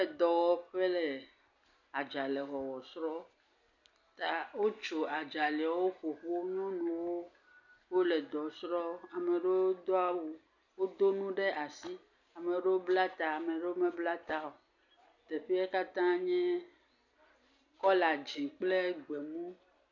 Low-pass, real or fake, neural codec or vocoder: 7.2 kHz; real; none